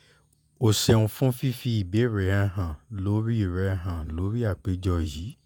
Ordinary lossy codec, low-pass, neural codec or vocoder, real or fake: none; none; vocoder, 48 kHz, 128 mel bands, Vocos; fake